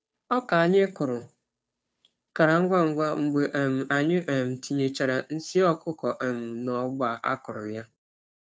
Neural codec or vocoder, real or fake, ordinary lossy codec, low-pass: codec, 16 kHz, 2 kbps, FunCodec, trained on Chinese and English, 25 frames a second; fake; none; none